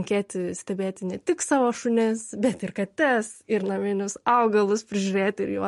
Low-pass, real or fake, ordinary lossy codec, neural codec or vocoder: 14.4 kHz; real; MP3, 48 kbps; none